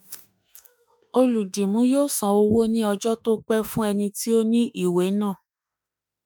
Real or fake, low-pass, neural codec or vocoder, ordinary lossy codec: fake; none; autoencoder, 48 kHz, 32 numbers a frame, DAC-VAE, trained on Japanese speech; none